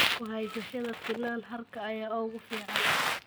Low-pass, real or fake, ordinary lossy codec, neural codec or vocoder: none; real; none; none